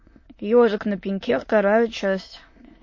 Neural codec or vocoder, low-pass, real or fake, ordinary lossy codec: autoencoder, 22.05 kHz, a latent of 192 numbers a frame, VITS, trained on many speakers; 7.2 kHz; fake; MP3, 32 kbps